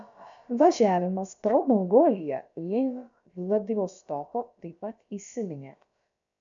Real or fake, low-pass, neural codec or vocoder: fake; 7.2 kHz; codec, 16 kHz, about 1 kbps, DyCAST, with the encoder's durations